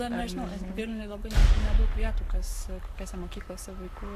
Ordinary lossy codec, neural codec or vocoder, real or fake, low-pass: AAC, 96 kbps; codec, 44.1 kHz, 7.8 kbps, Pupu-Codec; fake; 14.4 kHz